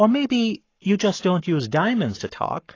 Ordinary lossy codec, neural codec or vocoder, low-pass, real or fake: AAC, 32 kbps; codec, 44.1 kHz, 7.8 kbps, Pupu-Codec; 7.2 kHz; fake